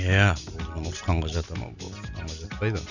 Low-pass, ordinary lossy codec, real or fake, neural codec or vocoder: 7.2 kHz; none; real; none